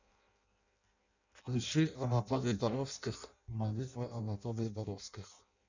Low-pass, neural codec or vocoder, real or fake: 7.2 kHz; codec, 16 kHz in and 24 kHz out, 0.6 kbps, FireRedTTS-2 codec; fake